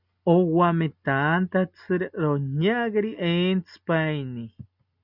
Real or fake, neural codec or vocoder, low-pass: real; none; 5.4 kHz